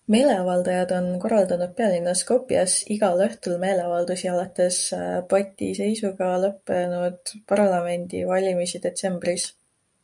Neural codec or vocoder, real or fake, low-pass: none; real; 10.8 kHz